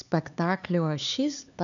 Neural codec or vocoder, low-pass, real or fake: codec, 16 kHz, 4 kbps, X-Codec, HuBERT features, trained on LibriSpeech; 7.2 kHz; fake